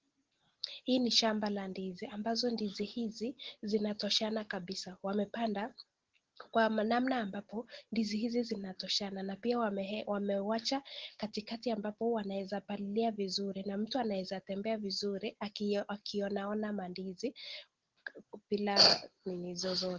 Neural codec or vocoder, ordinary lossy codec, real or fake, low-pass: none; Opus, 16 kbps; real; 7.2 kHz